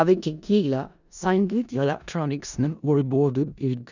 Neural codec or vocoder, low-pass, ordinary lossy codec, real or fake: codec, 16 kHz in and 24 kHz out, 0.4 kbps, LongCat-Audio-Codec, four codebook decoder; 7.2 kHz; none; fake